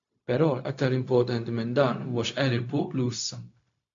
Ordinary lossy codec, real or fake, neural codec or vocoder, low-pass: AAC, 48 kbps; fake; codec, 16 kHz, 0.4 kbps, LongCat-Audio-Codec; 7.2 kHz